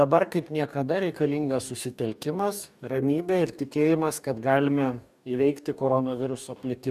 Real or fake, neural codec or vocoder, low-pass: fake; codec, 44.1 kHz, 2.6 kbps, DAC; 14.4 kHz